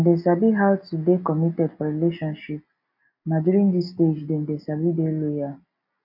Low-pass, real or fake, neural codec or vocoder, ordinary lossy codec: 5.4 kHz; real; none; AAC, 48 kbps